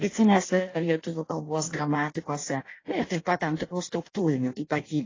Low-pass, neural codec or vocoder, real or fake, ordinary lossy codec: 7.2 kHz; codec, 16 kHz in and 24 kHz out, 0.6 kbps, FireRedTTS-2 codec; fake; AAC, 32 kbps